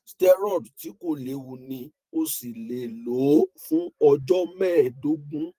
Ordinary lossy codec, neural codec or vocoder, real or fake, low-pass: Opus, 24 kbps; vocoder, 44.1 kHz, 128 mel bands every 512 samples, BigVGAN v2; fake; 14.4 kHz